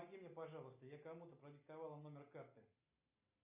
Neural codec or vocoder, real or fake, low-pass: none; real; 3.6 kHz